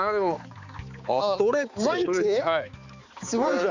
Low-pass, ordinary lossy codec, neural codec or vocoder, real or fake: 7.2 kHz; none; codec, 16 kHz, 4 kbps, X-Codec, HuBERT features, trained on balanced general audio; fake